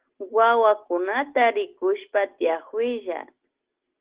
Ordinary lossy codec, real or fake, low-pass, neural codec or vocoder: Opus, 16 kbps; real; 3.6 kHz; none